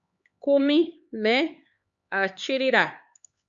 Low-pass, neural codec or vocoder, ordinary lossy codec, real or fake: 7.2 kHz; codec, 16 kHz, 4 kbps, X-Codec, HuBERT features, trained on LibriSpeech; Opus, 64 kbps; fake